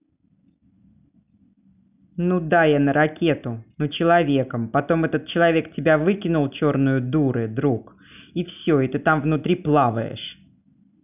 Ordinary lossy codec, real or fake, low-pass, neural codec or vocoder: none; real; 3.6 kHz; none